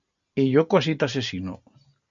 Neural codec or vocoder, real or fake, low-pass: none; real; 7.2 kHz